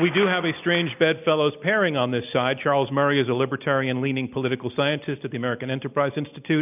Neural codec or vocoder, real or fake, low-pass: none; real; 3.6 kHz